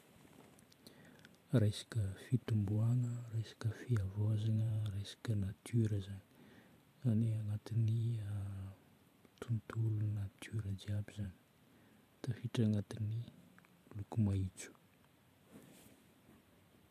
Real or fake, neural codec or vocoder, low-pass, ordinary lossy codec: fake; vocoder, 44.1 kHz, 128 mel bands every 512 samples, BigVGAN v2; 14.4 kHz; AAC, 96 kbps